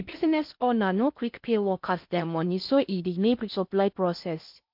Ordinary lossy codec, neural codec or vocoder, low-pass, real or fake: MP3, 48 kbps; codec, 16 kHz in and 24 kHz out, 0.6 kbps, FocalCodec, streaming, 4096 codes; 5.4 kHz; fake